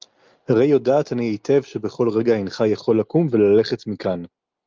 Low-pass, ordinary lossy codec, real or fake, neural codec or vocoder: 7.2 kHz; Opus, 24 kbps; real; none